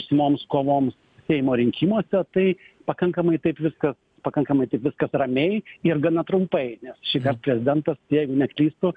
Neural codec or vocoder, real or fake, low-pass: none; real; 9.9 kHz